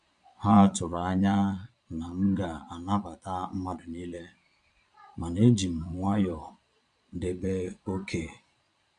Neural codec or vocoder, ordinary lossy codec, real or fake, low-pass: vocoder, 22.05 kHz, 80 mel bands, Vocos; none; fake; 9.9 kHz